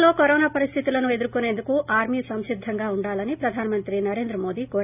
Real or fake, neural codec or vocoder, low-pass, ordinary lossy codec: real; none; 3.6 kHz; none